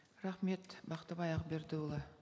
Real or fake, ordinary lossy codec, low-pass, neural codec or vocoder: real; none; none; none